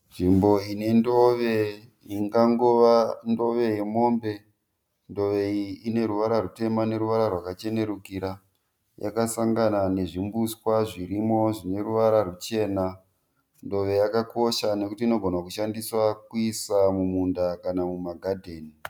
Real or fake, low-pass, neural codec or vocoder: real; 19.8 kHz; none